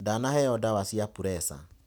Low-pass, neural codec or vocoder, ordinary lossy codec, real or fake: none; none; none; real